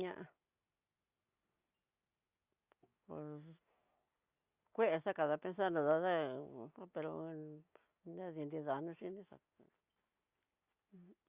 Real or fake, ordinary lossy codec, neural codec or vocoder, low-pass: real; Opus, 64 kbps; none; 3.6 kHz